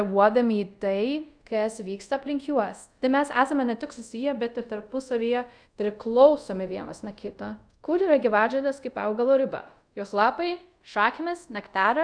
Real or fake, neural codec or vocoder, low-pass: fake; codec, 24 kHz, 0.5 kbps, DualCodec; 9.9 kHz